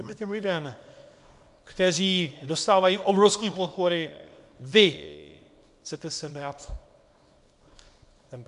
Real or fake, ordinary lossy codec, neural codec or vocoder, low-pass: fake; MP3, 64 kbps; codec, 24 kHz, 0.9 kbps, WavTokenizer, small release; 10.8 kHz